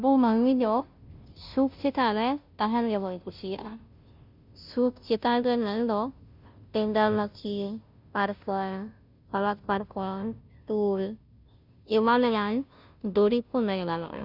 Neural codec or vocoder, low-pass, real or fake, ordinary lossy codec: codec, 16 kHz, 0.5 kbps, FunCodec, trained on Chinese and English, 25 frames a second; 5.4 kHz; fake; none